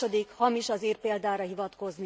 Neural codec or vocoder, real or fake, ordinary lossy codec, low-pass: none; real; none; none